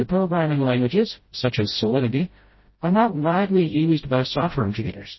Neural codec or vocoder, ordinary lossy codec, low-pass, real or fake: codec, 16 kHz, 0.5 kbps, FreqCodec, smaller model; MP3, 24 kbps; 7.2 kHz; fake